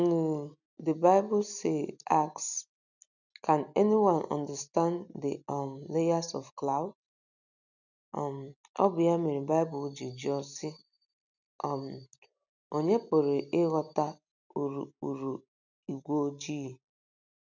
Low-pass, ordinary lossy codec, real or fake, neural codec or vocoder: 7.2 kHz; none; real; none